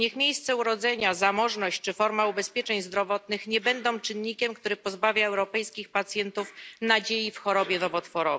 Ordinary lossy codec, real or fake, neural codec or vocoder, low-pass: none; real; none; none